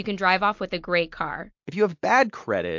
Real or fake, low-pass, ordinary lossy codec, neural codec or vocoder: real; 7.2 kHz; MP3, 48 kbps; none